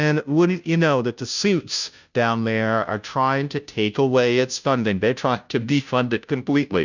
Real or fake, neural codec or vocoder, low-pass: fake; codec, 16 kHz, 0.5 kbps, FunCodec, trained on Chinese and English, 25 frames a second; 7.2 kHz